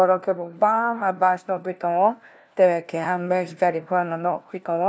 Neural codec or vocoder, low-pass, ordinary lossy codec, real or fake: codec, 16 kHz, 1 kbps, FunCodec, trained on LibriTTS, 50 frames a second; none; none; fake